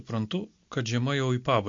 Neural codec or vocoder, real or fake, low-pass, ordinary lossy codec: none; real; 7.2 kHz; MP3, 48 kbps